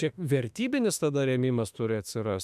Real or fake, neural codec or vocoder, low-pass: fake; autoencoder, 48 kHz, 32 numbers a frame, DAC-VAE, trained on Japanese speech; 14.4 kHz